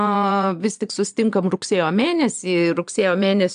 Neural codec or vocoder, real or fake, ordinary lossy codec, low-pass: vocoder, 22.05 kHz, 80 mel bands, Vocos; fake; AAC, 96 kbps; 9.9 kHz